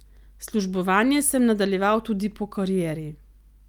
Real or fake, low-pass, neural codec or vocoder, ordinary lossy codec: real; 19.8 kHz; none; Opus, 32 kbps